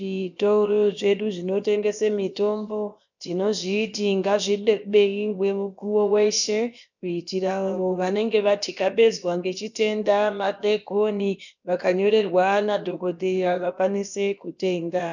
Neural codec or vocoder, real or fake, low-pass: codec, 16 kHz, about 1 kbps, DyCAST, with the encoder's durations; fake; 7.2 kHz